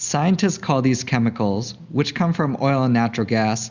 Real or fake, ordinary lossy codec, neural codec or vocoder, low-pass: real; Opus, 64 kbps; none; 7.2 kHz